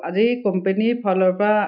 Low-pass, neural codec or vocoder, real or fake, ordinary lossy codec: 5.4 kHz; none; real; none